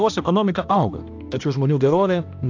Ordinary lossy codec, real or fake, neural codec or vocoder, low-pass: AAC, 48 kbps; fake; codec, 16 kHz, 1 kbps, X-Codec, HuBERT features, trained on balanced general audio; 7.2 kHz